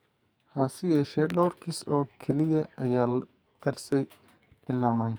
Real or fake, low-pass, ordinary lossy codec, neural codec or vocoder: fake; none; none; codec, 44.1 kHz, 2.6 kbps, SNAC